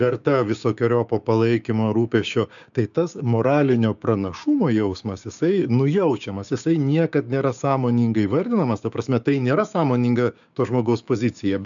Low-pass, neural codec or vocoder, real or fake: 7.2 kHz; codec, 16 kHz, 6 kbps, DAC; fake